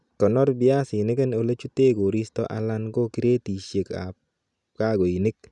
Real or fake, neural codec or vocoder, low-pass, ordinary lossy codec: real; none; 10.8 kHz; none